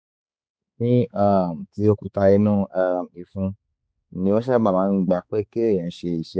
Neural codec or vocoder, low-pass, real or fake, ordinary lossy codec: codec, 16 kHz, 4 kbps, X-Codec, HuBERT features, trained on balanced general audio; none; fake; none